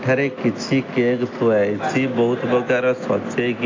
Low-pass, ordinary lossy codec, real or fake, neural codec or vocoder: 7.2 kHz; AAC, 32 kbps; real; none